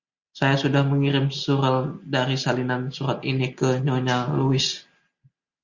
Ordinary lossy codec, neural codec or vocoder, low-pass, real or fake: Opus, 64 kbps; none; 7.2 kHz; real